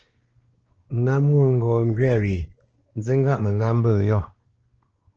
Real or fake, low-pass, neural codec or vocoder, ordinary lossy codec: fake; 7.2 kHz; codec, 16 kHz, 2 kbps, X-Codec, WavLM features, trained on Multilingual LibriSpeech; Opus, 16 kbps